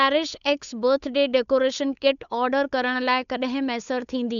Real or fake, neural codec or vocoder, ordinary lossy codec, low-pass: fake; codec, 16 kHz, 8 kbps, FunCodec, trained on Chinese and English, 25 frames a second; none; 7.2 kHz